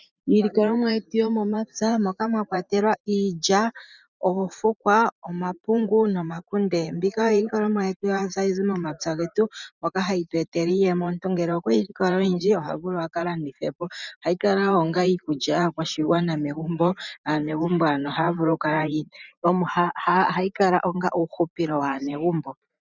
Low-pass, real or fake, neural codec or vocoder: 7.2 kHz; fake; vocoder, 44.1 kHz, 128 mel bands every 512 samples, BigVGAN v2